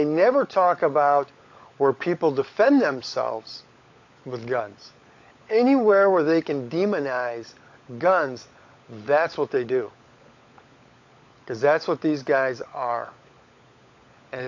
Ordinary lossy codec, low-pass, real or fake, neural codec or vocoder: AAC, 48 kbps; 7.2 kHz; fake; codec, 16 kHz, 16 kbps, FunCodec, trained on LibriTTS, 50 frames a second